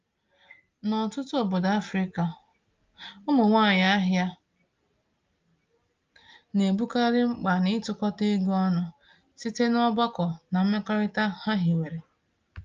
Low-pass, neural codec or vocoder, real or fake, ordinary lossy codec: 7.2 kHz; none; real; Opus, 24 kbps